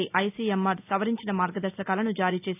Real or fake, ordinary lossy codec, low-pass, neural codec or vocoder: real; AAC, 32 kbps; 3.6 kHz; none